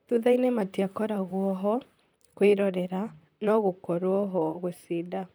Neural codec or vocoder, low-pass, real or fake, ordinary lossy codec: vocoder, 44.1 kHz, 128 mel bands, Pupu-Vocoder; none; fake; none